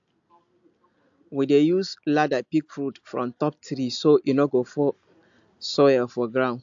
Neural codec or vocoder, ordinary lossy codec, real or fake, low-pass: none; AAC, 64 kbps; real; 7.2 kHz